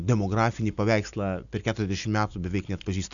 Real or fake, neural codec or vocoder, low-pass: real; none; 7.2 kHz